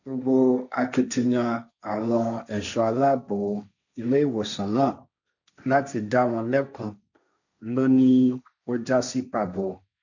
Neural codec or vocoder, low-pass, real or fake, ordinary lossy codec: codec, 16 kHz, 1.1 kbps, Voila-Tokenizer; 7.2 kHz; fake; none